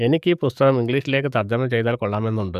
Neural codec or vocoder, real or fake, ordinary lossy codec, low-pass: codec, 44.1 kHz, 7.8 kbps, DAC; fake; none; 14.4 kHz